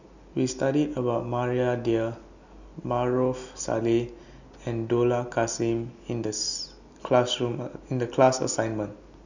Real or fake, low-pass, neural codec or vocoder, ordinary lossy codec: real; 7.2 kHz; none; none